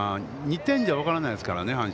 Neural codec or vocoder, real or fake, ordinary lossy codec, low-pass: none; real; none; none